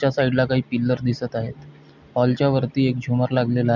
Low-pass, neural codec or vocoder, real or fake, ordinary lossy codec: 7.2 kHz; none; real; none